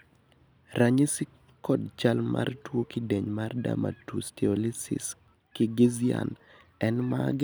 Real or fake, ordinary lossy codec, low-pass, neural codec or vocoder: real; none; none; none